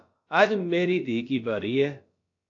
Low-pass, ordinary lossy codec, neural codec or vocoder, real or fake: 7.2 kHz; AAC, 48 kbps; codec, 16 kHz, about 1 kbps, DyCAST, with the encoder's durations; fake